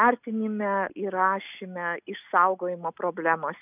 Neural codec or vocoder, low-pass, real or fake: none; 3.6 kHz; real